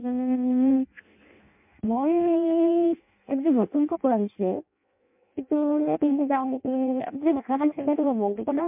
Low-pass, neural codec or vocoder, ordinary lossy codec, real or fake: 3.6 kHz; codec, 16 kHz in and 24 kHz out, 0.6 kbps, FireRedTTS-2 codec; MP3, 32 kbps; fake